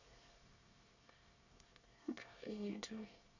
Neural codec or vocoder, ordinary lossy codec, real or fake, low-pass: codec, 24 kHz, 1 kbps, SNAC; none; fake; 7.2 kHz